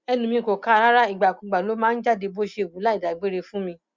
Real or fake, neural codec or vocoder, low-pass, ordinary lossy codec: real; none; 7.2 kHz; Opus, 64 kbps